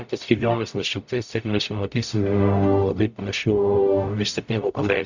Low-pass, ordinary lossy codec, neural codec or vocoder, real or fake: 7.2 kHz; Opus, 64 kbps; codec, 44.1 kHz, 0.9 kbps, DAC; fake